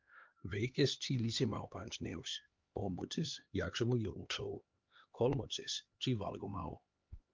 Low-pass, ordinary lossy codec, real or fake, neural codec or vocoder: 7.2 kHz; Opus, 24 kbps; fake; codec, 16 kHz, 2 kbps, X-Codec, HuBERT features, trained on LibriSpeech